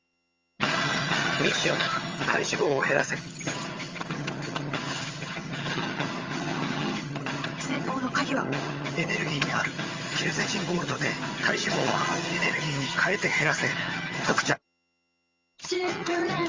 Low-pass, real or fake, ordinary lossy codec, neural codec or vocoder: 7.2 kHz; fake; Opus, 32 kbps; vocoder, 22.05 kHz, 80 mel bands, HiFi-GAN